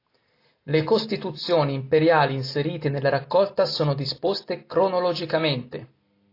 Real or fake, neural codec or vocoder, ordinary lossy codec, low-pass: real; none; AAC, 32 kbps; 5.4 kHz